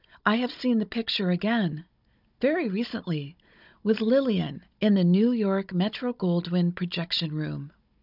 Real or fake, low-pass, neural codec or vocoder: fake; 5.4 kHz; codec, 16 kHz, 16 kbps, FunCodec, trained on Chinese and English, 50 frames a second